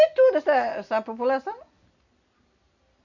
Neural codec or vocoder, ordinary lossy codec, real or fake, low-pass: none; none; real; 7.2 kHz